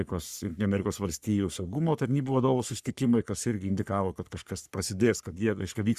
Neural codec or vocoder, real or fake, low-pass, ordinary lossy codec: codec, 44.1 kHz, 3.4 kbps, Pupu-Codec; fake; 14.4 kHz; Opus, 64 kbps